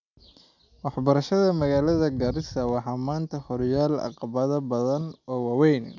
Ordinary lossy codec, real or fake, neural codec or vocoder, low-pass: none; real; none; 7.2 kHz